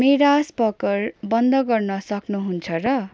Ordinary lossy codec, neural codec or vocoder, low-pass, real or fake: none; none; none; real